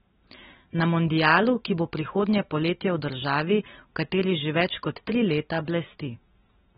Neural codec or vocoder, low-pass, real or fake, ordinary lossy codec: none; 19.8 kHz; real; AAC, 16 kbps